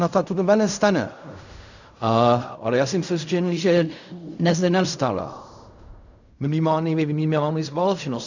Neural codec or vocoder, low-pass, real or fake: codec, 16 kHz in and 24 kHz out, 0.4 kbps, LongCat-Audio-Codec, fine tuned four codebook decoder; 7.2 kHz; fake